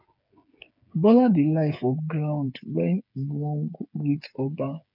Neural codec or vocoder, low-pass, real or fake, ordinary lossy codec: codec, 16 kHz, 8 kbps, FreqCodec, smaller model; 5.4 kHz; fake; none